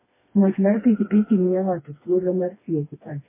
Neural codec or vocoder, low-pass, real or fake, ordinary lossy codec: codec, 16 kHz, 2 kbps, FreqCodec, smaller model; 3.6 kHz; fake; MP3, 16 kbps